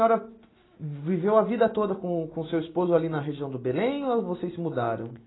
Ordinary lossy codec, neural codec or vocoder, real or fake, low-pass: AAC, 16 kbps; none; real; 7.2 kHz